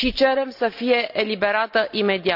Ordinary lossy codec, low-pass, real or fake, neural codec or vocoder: none; 5.4 kHz; real; none